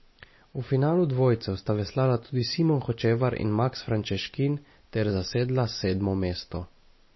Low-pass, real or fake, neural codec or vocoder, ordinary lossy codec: 7.2 kHz; real; none; MP3, 24 kbps